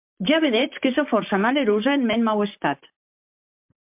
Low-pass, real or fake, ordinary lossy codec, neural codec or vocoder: 3.6 kHz; fake; MP3, 32 kbps; vocoder, 44.1 kHz, 128 mel bands, Pupu-Vocoder